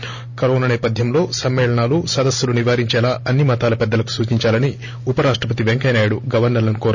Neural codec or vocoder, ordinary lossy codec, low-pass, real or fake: none; none; 7.2 kHz; real